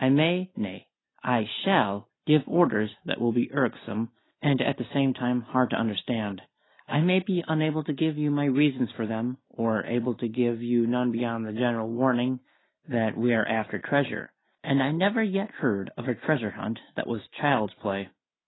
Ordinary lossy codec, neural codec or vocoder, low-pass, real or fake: AAC, 16 kbps; none; 7.2 kHz; real